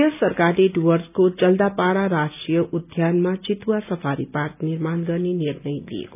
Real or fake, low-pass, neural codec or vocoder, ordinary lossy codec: real; 3.6 kHz; none; none